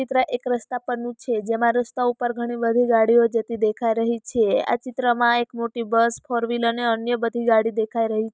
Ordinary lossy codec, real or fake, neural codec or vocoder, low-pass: none; real; none; none